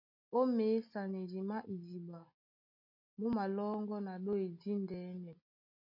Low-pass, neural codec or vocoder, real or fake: 5.4 kHz; none; real